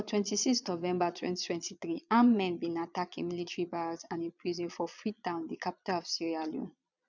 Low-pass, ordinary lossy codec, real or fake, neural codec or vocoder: 7.2 kHz; none; fake; vocoder, 22.05 kHz, 80 mel bands, Vocos